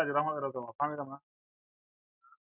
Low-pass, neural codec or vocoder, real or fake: 3.6 kHz; none; real